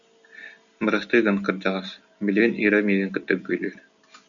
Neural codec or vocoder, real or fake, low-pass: none; real; 7.2 kHz